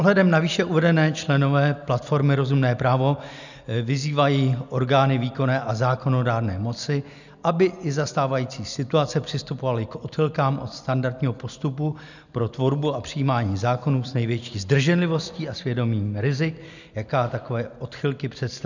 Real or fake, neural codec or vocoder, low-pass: real; none; 7.2 kHz